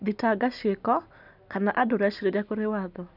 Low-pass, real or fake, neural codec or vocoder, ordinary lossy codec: 5.4 kHz; real; none; none